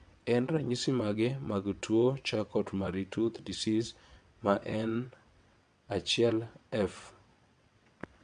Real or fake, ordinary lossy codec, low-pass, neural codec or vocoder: fake; MP3, 64 kbps; 9.9 kHz; vocoder, 22.05 kHz, 80 mel bands, WaveNeXt